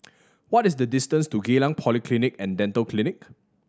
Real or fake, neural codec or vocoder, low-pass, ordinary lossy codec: real; none; none; none